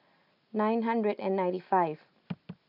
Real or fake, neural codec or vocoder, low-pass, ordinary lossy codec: real; none; 5.4 kHz; none